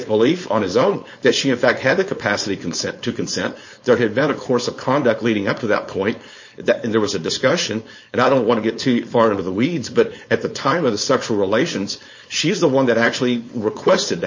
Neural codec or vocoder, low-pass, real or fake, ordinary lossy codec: codec, 16 kHz, 4.8 kbps, FACodec; 7.2 kHz; fake; MP3, 32 kbps